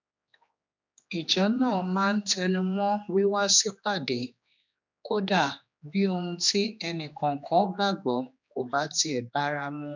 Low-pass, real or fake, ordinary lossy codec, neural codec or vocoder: 7.2 kHz; fake; MP3, 64 kbps; codec, 16 kHz, 2 kbps, X-Codec, HuBERT features, trained on general audio